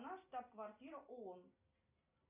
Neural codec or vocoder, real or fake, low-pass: none; real; 3.6 kHz